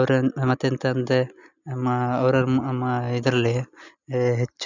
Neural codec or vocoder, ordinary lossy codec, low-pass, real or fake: none; none; 7.2 kHz; real